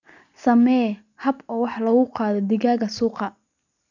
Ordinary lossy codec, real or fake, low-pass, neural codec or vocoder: none; real; 7.2 kHz; none